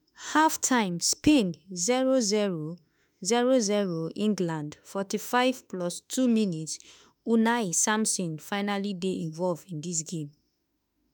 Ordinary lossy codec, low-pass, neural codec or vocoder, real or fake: none; none; autoencoder, 48 kHz, 32 numbers a frame, DAC-VAE, trained on Japanese speech; fake